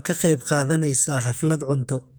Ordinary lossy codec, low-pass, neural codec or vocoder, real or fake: none; none; codec, 44.1 kHz, 2.6 kbps, SNAC; fake